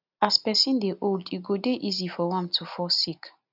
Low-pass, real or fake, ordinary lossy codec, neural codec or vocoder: 5.4 kHz; real; none; none